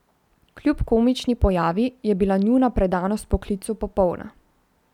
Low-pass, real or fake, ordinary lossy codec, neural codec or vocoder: 19.8 kHz; real; none; none